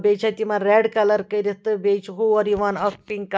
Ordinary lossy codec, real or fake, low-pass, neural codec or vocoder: none; real; none; none